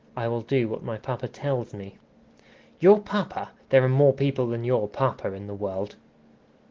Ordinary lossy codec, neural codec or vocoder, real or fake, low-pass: Opus, 16 kbps; none; real; 7.2 kHz